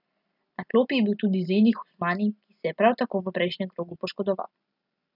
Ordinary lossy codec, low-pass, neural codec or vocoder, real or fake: none; 5.4 kHz; none; real